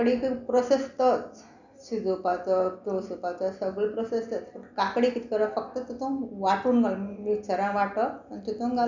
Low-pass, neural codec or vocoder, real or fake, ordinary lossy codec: 7.2 kHz; none; real; none